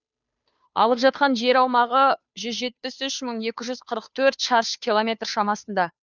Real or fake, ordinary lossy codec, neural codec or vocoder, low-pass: fake; none; codec, 16 kHz, 2 kbps, FunCodec, trained on Chinese and English, 25 frames a second; 7.2 kHz